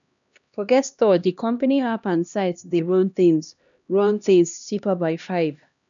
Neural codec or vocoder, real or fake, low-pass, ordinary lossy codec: codec, 16 kHz, 1 kbps, X-Codec, HuBERT features, trained on LibriSpeech; fake; 7.2 kHz; none